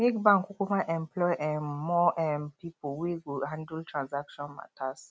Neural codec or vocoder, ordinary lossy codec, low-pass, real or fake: none; none; none; real